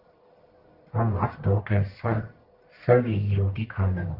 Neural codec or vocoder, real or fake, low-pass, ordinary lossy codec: codec, 44.1 kHz, 1.7 kbps, Pupu-Codec; fake; 5.4 kHz; Opus, 32 kbps